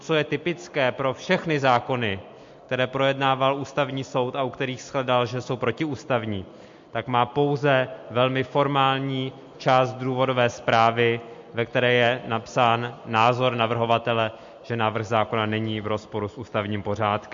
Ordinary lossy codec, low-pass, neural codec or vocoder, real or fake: MP3, 48 kbps; 7.2 kHz; none; real